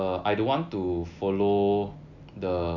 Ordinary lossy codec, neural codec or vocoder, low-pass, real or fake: none; none; 7.2 kHz; real